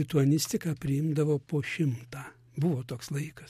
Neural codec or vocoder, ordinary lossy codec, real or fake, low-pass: none; MP3, 64 kbps; real; 14.4 kHz